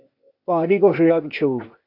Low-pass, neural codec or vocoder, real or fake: 5.4 kHz; codec, 16 kHz, 0.8 kbps, ZipCodec; fake